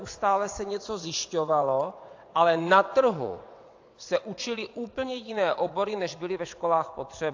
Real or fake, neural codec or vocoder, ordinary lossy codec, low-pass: fake; vocoder, 22.05 kHz, 80 mel bands, WaveNeXt; AAC, 48 kbps; 7.2 kHz